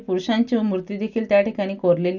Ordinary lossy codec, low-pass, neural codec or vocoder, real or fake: none; 7.2 kHz; none; real